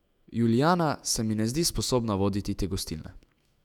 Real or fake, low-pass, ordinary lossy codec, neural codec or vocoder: fake; 19.8 kHz; none; autoencoder, 48 kHz, 128 numbers a frame, DAC-VAE, trained on Japanese speech